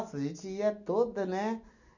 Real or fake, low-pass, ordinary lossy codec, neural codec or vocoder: real; 7.2 kHz; none; none